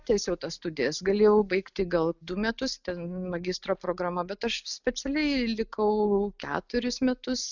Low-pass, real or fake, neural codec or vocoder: 7.2 kHz; real; none